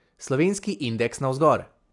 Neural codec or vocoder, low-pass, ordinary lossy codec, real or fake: none; 10.8 kHz; AAC, 64 kbps; real